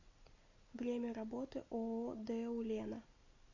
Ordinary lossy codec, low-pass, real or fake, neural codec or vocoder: AAC, 48 kbps; 7.2 kHz; real; none